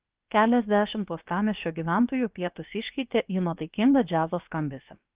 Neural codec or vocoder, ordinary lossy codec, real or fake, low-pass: codec, 16 kHz, about 1 kbps, DyCAST, with the encoder's durations; Opus, 24 kbps; fake; 3.6 kHz